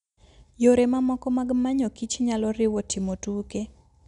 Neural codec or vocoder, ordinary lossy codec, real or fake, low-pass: none; none; real; 10.8 kHz